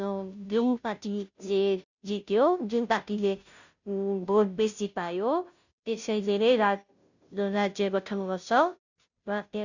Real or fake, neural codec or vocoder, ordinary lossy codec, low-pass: fake; codec, 16 kHz, 0.5 kbps, FunCodec, trained on Chinese and English, 25 frames a second; none; 7.2 kHz